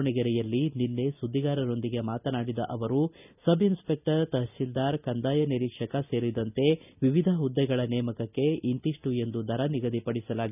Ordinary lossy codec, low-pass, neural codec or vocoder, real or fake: none; 3.6 kHz; none; real